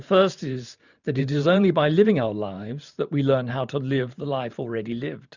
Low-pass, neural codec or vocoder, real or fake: 7.2 kHz; vocoder, 44.1 kHz, 128 mel bands every 512 samples, BigVGAN v2; fake